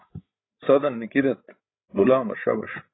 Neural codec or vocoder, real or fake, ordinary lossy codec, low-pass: codec, 16 kHz, 8 kbps, FreqCodec, larger model; fake; AAC, 16 kbps; 7.2 kHz